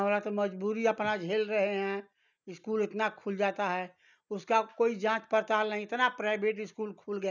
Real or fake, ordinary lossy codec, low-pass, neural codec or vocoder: real; none; 7.2 kHz; none